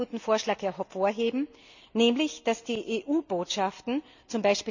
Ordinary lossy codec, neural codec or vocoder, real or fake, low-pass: none; none; real; 7.2 kHz